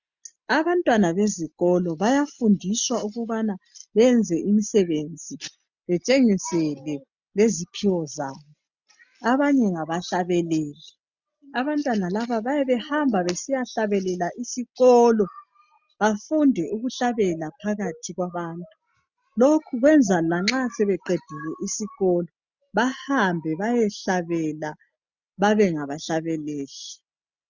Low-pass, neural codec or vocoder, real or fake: 7.2 kHz; none; real